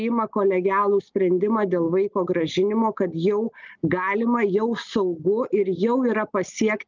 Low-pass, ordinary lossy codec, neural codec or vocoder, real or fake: 7.2 kHz; Opus, 24 kbps; none; real